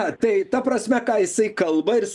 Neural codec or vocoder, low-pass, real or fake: none; 10.8 kHz; real